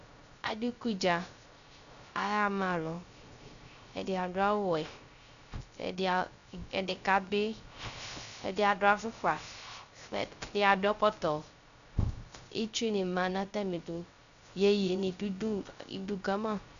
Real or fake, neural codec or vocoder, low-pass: fake; codec, 16 kHz, 0.3 kbps, FocalCodec; 7.2 kHz